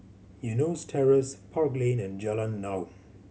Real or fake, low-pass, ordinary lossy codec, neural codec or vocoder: real; none; none; none